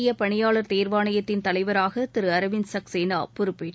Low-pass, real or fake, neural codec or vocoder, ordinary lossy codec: none; real; none; none